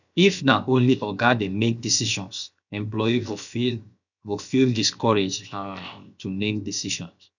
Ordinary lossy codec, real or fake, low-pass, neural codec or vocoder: none; fake; 7.2 kHz; codec, 16 kHz, 0.7 kbps, FocalCodec